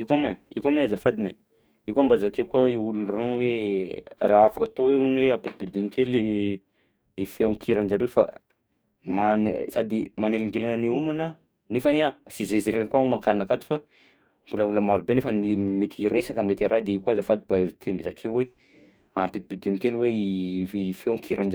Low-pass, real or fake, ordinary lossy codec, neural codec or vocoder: none; fake; none; codec, 44.1 kHz, 2.6 kbps, DAC